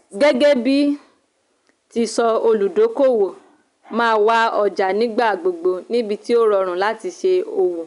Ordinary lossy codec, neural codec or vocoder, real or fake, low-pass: none; none; real; 10.8 kHz